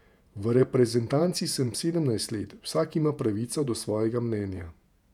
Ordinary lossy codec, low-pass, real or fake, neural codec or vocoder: none; 19.8 kHz; fake; vocoder, 48 kHz, 128 mel bands, Vocos